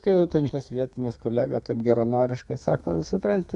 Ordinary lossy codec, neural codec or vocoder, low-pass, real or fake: Opus, 64 kbps; codec, 32 kHz, 1.9 kbps, SNAC; 10.8 kHz; fake